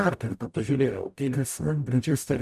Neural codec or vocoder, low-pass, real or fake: codec, 44.1 kHz, 0.9 kbps, DAC; 14.4 kHz; fake